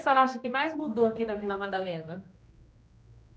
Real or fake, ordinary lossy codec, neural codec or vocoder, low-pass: fake; none; codec, 16 kHz, 1 kbps, X-Codec, HuBERT features, trained on general audio; none